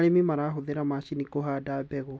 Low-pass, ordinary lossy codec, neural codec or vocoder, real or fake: none; none; none; real